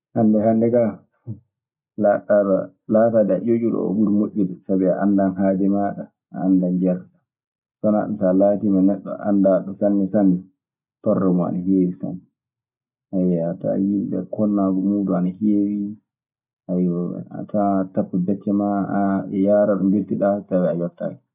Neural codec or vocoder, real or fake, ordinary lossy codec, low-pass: none; real; none; 3.6 kHz